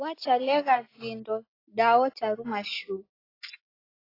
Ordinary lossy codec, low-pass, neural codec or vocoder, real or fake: AAC, 24 kbps; 5.4 kHz; none; real